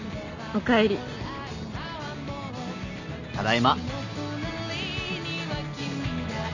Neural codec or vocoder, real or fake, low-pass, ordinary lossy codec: none; real; 7.2 kHz; none